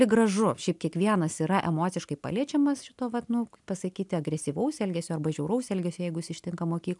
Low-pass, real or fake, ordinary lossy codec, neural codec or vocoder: 10.8 kHz; real; MP3, 96 kbps; none